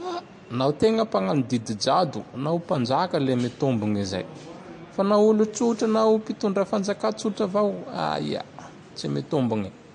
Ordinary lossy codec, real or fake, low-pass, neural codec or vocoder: MP3, 48 kbps; real; 10.8 kHz; none